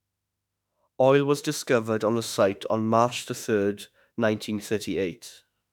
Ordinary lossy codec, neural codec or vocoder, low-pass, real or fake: none; autoencoder, 48 kHz, 32 numbers a frame, DAC-VAE, trained on Japanese speech; 19.8 kHz; fake